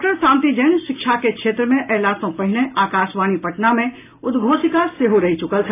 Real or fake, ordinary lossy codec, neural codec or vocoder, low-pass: real; AAC, 16 kbps; none; 3.6 kHz